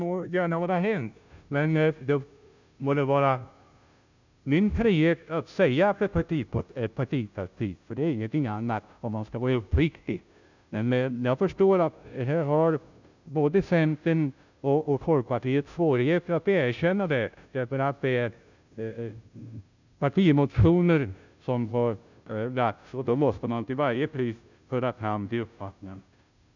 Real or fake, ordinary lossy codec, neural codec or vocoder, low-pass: fake; none; codec, 16 kHz, 0.5 kbps, FunCodec, trained on Chinese and English, 25 frames a second; 7.2 kHz